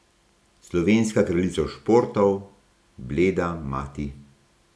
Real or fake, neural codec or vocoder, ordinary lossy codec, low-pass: real; none; none; none